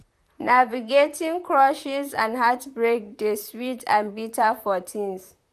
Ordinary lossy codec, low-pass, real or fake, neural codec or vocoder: none; 14.4 kHz; fake; vocoder, 44.1 kHz, 128 mel bands, Pupu-Vocoder